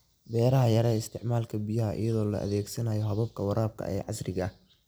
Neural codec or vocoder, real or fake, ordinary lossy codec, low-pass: vocoder, 44.1 kHz, 128 mel bands every 256 samples, BigVGAN v2; fake; none; none